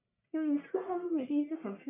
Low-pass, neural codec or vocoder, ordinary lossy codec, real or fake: 3.6 kHz; codec, 44.1 kHz, 1.7 kbps, Pupu-Codec; MP3, 32 kbps; fake